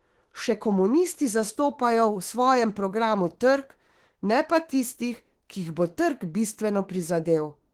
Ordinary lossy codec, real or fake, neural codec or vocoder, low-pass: Opus, 16 kbps; fake; autoencoder, 48 kHz, 32 numbers a frame, DAC-VAE, trained on Japanese speech; 19.8 kHz